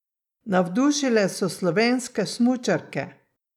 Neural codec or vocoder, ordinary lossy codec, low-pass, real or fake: none; none; 19.8 kHz; real